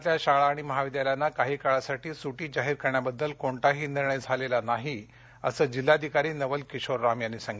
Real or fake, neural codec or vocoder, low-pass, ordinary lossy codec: real; none; none; none